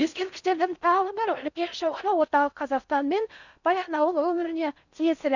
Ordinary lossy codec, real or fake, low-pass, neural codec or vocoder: none; fake; 7.2 kHz; codec, 16 kHz in and 24 kHz out, 0.6 kbps, FocalCodec, streaming, 4096 codes